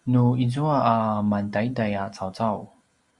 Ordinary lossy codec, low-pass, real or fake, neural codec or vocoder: Opus, 64 kbps; 10.8 kHz; real; none